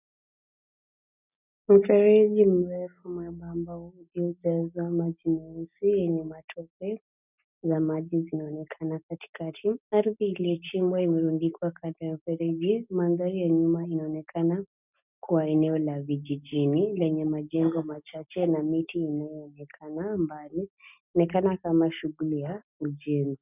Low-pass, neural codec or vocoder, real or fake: 3.6 kHz; none; real